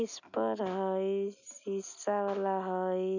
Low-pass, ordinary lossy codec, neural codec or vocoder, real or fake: 7.2 kHz; none; none; real